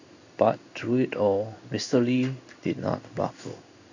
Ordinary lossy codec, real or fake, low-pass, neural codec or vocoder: none; fake; 7.2 kHz; codec, 16 kHz in and 24 kHz out, 1 kbps, XY-Tokenizer